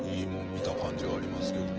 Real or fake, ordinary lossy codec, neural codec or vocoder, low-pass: fake; Opus, 16 kbps; vocoder, 24 kHz, 100 mel bands, Vocos; 7.2 kHz